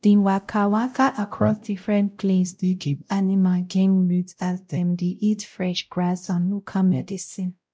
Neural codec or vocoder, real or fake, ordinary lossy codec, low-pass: codec, 16 kHz, 0.5 kbps, X-Codec, WavLM features, trained on Multilingual LibriSpeech; fake; none; none